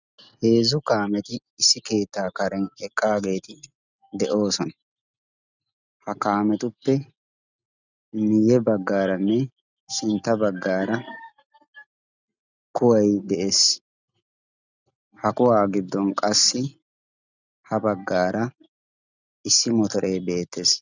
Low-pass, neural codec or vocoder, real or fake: 7.2 kHz; none; real